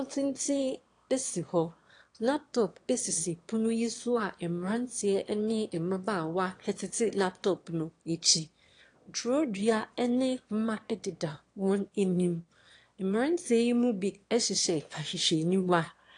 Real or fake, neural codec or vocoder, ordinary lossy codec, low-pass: fake; autoencoder, 22.05 kHz, a latent of 192 numbers a frame, VITS, trained on one speaker; AAC, 48 kbps; 9.9 kHz